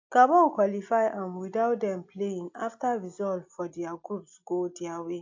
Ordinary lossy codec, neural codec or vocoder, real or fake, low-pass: AAC, 48 kbps; none; real; 7.2 kHz